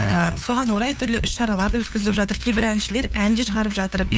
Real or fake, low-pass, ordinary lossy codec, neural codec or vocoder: fake; none; none; codec, 16 kHz, 2 kbps, FunCodec, trained on LibriTTS, 25 frames a second